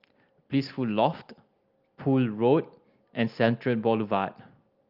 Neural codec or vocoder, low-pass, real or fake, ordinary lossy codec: none; 5.4 kHz; real; Opus, 24 kbps